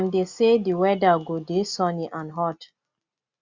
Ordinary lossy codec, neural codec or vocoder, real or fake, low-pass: Opus, 64 kbps; none; real; 7.2 kHz